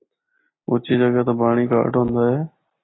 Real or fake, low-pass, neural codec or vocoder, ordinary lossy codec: real; 7.2 kHz; none; AAC, 16 kbps